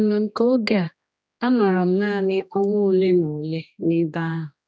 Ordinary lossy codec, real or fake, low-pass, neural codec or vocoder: none; fake; none; codec, 16 kHz, 1 kbps, X-Codec, HuBERT features, trained on general audio